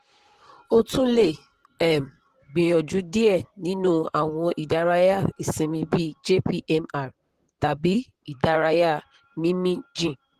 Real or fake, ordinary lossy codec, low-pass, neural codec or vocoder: fake; Opus, 16 kbps; 14.4 kHz; vocoder, 44.1 kHz, 128 mel bands, Pupu-Vocoder